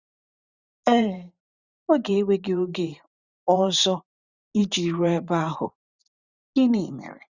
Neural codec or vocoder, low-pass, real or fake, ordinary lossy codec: vocoder, 44.1 kHz, 128 mel bands every 512 samples, BigVGAN v2; 7.2 kHz; fake; Opus, 64 kbps